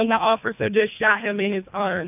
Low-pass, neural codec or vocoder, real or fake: 3.6 kHz; codec, 24 kHz, 1.5 kbps, HILCodec; fake